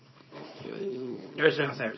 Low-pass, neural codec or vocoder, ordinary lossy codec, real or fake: 7.2 kHz; codec, 24 kHz, 0.9 kbps, WavTokenizer, small release; MP3, 24 kbps; fake